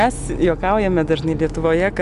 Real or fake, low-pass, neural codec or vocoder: real; 10.8 kHz; none